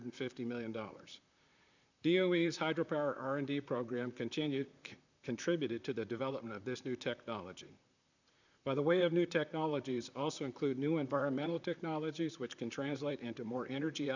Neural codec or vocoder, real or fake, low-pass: vocoder, 44.1 kHz, 128 mel bands, Pupu-Vocoder; fake; 7.2 kHz